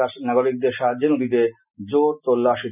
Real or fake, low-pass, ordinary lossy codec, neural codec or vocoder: real; 3.6 kHz; none; none